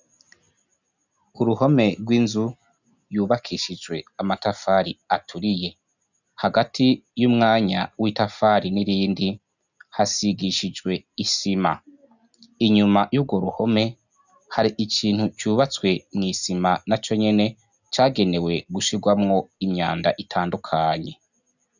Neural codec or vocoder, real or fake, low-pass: none; real; 7.2 kHz